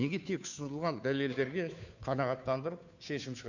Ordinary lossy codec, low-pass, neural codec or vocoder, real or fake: none; 7.2 kHz; codec, 16 kHz, 2 kbps, FunCodec, trained on Chinese and English, 25 frames a second; fake